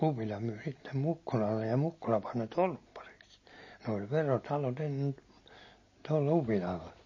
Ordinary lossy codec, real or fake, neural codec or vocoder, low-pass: MP3, 32 kbps; real; none; 7.2 kHz